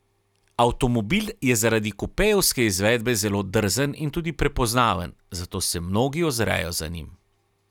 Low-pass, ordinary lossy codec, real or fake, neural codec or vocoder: 19.8 kHz; none; real; none